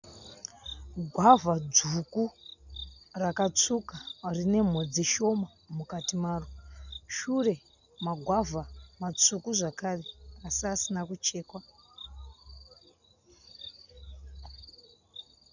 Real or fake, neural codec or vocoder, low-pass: real; none; 7.2 kHz